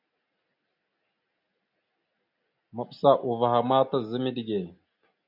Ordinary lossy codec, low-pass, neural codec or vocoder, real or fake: MP3, 48 kbps; 5.4 kHz; none; real